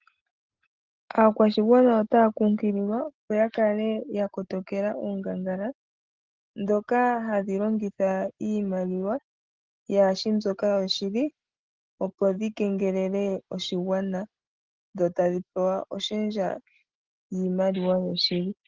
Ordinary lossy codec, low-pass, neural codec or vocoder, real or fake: Opus, 16 kbps; 7.2 kHz; none; real